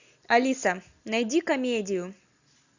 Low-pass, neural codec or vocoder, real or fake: 7.2 kHz; none; real